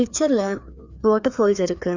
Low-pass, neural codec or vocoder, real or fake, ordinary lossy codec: 7.2 kHz; codec, 16 kHz, 2 kbps, FreqCodec, larger model; fake; none